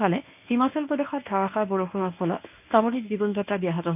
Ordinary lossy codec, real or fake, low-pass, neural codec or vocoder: AAC, 32 kbps; fake; 3.6 kHz; codec, 24 kHz, 0.9 kbps, WavTokenizer, medium speech release version 1